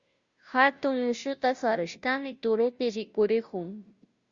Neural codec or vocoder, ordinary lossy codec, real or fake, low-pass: codec, 16 kHz, 0.5 kbps, FunCodec, trained on Chinese and English, 25 frames a second; Opus, 64 kbps; fake; 7.2 kHz